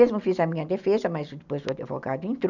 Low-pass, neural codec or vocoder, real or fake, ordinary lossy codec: 7.2 kHz; none; real; none